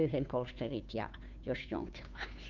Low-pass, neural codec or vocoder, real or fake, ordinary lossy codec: 7.2 kHz; codec, 16 kHz, 2 kbps, FunCodec, trained on Chinese and English, 25 frames a second; fake; none